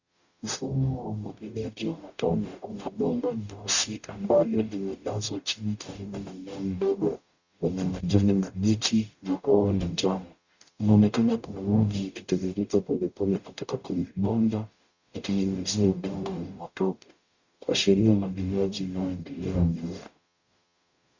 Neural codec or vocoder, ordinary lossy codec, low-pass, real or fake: codec, 44.1 kHz, 0.9 kbps, DAC; Opus, 64 kbps; 7.2 kHz; fake